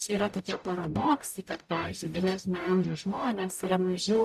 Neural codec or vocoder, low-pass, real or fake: codec, 44.1 kHz, 0.9 kbps, DAC; 14.4 kHz; fake